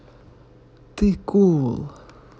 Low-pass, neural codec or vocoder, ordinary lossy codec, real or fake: none; none; none; real